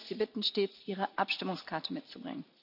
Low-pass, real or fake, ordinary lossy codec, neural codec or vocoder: 5.4 kHz; real; none; none